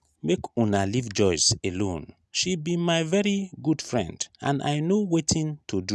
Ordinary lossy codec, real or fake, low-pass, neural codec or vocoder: none; real; none; none